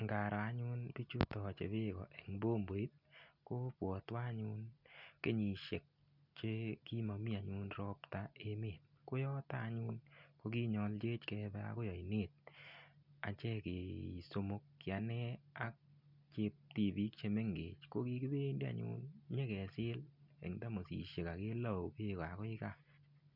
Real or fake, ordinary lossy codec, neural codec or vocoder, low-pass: real; none; none; 5.4 kHz